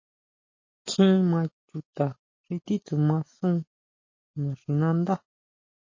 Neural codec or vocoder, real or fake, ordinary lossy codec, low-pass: none; real; MP3, 32 kbps; 7.2 kHz